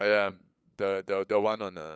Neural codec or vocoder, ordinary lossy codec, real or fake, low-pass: codec, 16 kHz, 8 kbps, FunCodec, trained on LibriTTS, 25 frames a second; none; fake; none